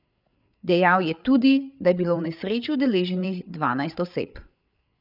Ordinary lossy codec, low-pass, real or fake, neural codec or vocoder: none; 5.4 kHz; fake; codec, 16 kHz, 8 kbps, FreqCodec, larger model